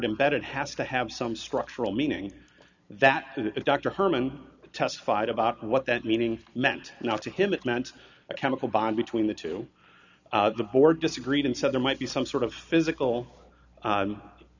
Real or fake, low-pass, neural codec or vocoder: real; 7.2 kHz; none